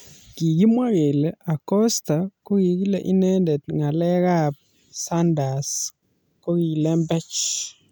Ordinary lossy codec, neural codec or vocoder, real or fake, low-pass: none; none; real; none